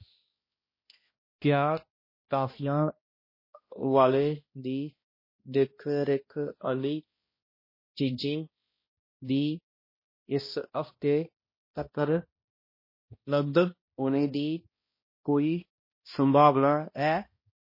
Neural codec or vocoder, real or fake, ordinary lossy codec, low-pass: codec, 16 kHz, 1 kbps, X-Codec, HuBERT features, trained on balanced general audio; fake; MP3, 24 kbps; 5.4 kHz